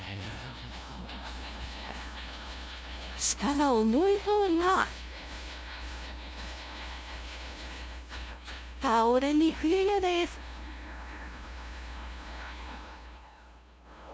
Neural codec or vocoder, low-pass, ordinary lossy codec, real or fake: codec, 16 kHz, 0.5 kbps, FunCodec, trained on LibriTTS, 25 frames a second; none; none; fake